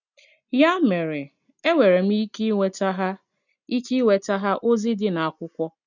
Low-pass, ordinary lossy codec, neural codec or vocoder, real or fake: 7.2 kHz; none; none; real